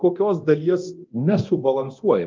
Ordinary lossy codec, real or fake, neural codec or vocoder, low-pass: Opus, 32 kbps; fake; codec, 24 kHz, 0.9 kbps, DualCodec; 7.2 kHz